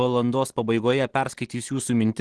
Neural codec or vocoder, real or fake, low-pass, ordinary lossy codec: none; real; 10.8 kHz; Opus, 16 kbps